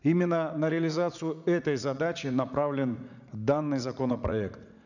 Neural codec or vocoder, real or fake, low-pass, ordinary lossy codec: vocoder, 44.1 kHz, 80 mel bands, Vocos; fake; 7.2 kHz; none